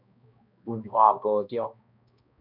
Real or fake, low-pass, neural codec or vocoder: fake; 5.4 kHz; codec, 16 kHz, 1 kbps, X-Codec, HuBERT features, trained on balanced general audio